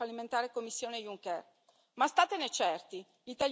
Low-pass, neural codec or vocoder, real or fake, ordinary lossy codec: none; none; real; none